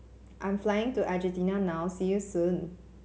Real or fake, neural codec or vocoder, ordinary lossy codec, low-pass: real; none; none; none